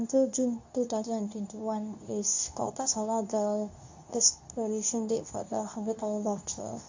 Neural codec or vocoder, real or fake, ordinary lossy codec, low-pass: codec, 24 kHz, 0.9 kbps, WavTokenizer, medium speech release version 2; fake; AAC, 48 kbps; 7.2 kHz